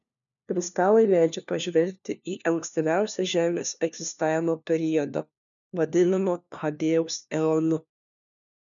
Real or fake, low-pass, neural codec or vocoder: fake; 7.2 kHz; codec, 16 kHz, 1 kbps, FunCodec, trained on LibriTTS, 50 frames a second